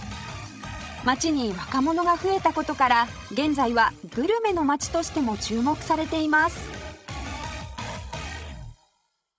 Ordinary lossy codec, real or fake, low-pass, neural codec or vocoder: none; fake; none; codec, 16 kHz, 16 kbps, FreqCodec, larger model